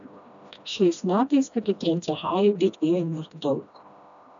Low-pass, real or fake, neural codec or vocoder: 7.2 kHz; fake; codec, 16 kHz, 1 kbps, FreqCodec, smaller model